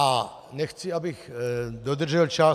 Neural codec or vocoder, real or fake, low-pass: none; real; 14.4 kHz